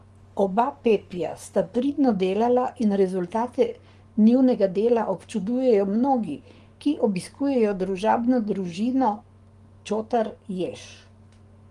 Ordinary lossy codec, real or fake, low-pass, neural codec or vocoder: Opus, 32 kbps; fake; 10.8 kHz; codec, 44.1 kHz, 7.8 kbps, Pupu-Codec